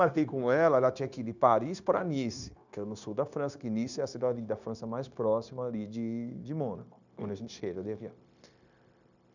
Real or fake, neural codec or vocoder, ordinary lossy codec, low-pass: fake; codec, 16 kHz, 0.9 kbps, LongCat-Audio-Codec; none; 7.2 kHz